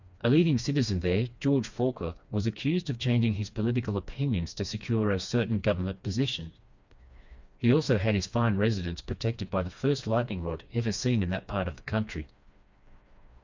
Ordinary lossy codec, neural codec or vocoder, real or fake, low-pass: Opus, 64 kbps; codec, 16 kHz, 2 kbps, FreqCodec, smaller model; fake; 7.2 kHz